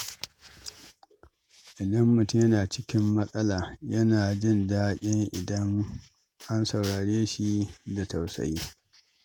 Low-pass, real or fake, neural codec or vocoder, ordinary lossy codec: none; fake; vocoder, 48 kHz, 128 mel bands, Vocos; none